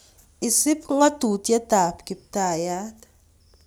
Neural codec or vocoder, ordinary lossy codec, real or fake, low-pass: none; none; real; none